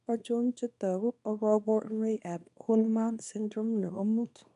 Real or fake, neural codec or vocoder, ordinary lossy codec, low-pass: fake; codec, 24 kHz, 0.9 kbps, WavTokenizer, small release; none; 10.8 kHz